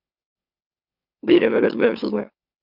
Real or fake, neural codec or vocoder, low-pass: fake; autoencoder, 44.1 kHz, a latent of 192 numbers a frame, MeloTTS; 5.4 kHz